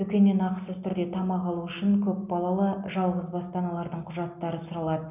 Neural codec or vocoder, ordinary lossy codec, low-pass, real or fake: none; none; 3.6 kHz; real